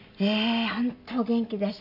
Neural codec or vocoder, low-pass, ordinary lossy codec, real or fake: none; 5.4 kHz; MP3, 48 kbps; real